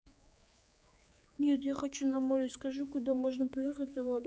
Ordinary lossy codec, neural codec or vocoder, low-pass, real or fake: none; codec, 16 kHz, 4 kbps, X-Codec, HuBERT features, trained on general audio; none; fake